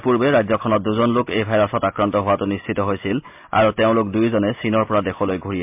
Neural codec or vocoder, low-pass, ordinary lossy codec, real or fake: none; 3.6 kHz; MP3, 32 kbps; real